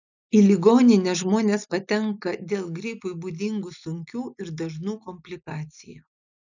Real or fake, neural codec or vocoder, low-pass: real; none; 7.2 kHz